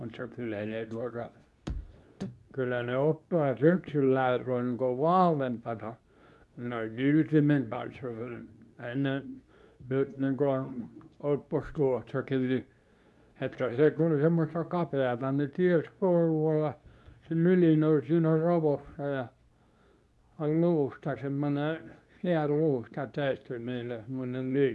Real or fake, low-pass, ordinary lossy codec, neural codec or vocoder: fake; none; none; codec, 24 kHz, 0.9 kbps, WavTokenizer, medium speech release version 2